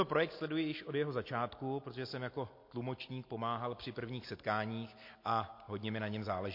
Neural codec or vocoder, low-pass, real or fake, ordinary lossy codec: none; 5.4 kHz; real; MP3, 32 kbps